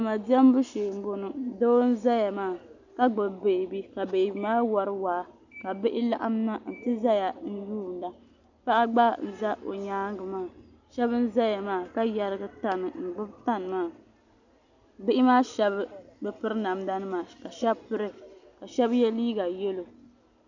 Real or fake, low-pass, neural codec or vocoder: real; 7.2 kHz; none